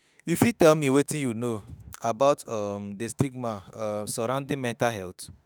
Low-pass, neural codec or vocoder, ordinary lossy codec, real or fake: none; autoencoder, 48 kHz, 32 numbers a frame, DAC-VAE, trained on Japanese speech; none; fake